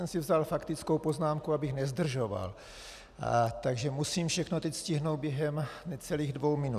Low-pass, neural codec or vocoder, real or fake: 14.4 kHz; none; real